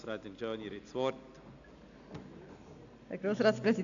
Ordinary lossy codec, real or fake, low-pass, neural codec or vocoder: none; real; 7.2 kHz; none